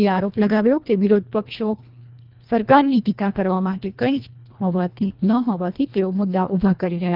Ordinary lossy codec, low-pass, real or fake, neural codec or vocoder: Opus, 32 kbps; 5.4 kHz; fake; codec, 24 kHz, 1.5 kbps, HILCodec